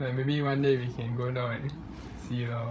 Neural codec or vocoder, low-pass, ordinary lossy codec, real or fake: codec, 16 kHz, 8 kbps, FreqCodec, larger model; none; none; fake